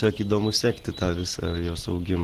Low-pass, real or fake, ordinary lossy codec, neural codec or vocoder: 14.4 kHz; real; Opus, 16 kbps; none